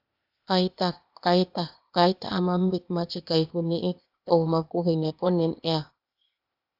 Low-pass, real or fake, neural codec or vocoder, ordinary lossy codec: 5.4 kHz; fake; codec, 16 kHz, 0.8 kbps, ZipCodec; AAC, 48 kbps